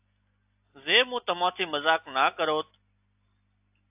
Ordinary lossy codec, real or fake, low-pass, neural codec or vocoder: AAC, 32 kbps; real; 3.6 kHz; none